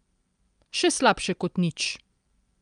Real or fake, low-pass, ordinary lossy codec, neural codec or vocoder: real; 9.9 kHz; Opus, 32 kbps; none